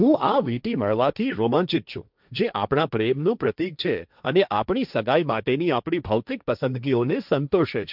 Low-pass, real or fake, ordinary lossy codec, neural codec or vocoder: 5.4 kHz; fake; none; codec, 16 kHz, 1.1 kbps, Voila-Tokenizer